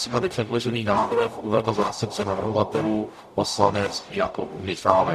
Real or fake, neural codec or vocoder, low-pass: fake; codec, 44.1 kHz, 0.9 kbps, DAC; 14.4 kHz